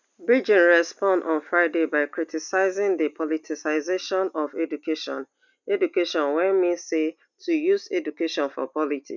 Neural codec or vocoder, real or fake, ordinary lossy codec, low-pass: none; real; none; 7.2 kHz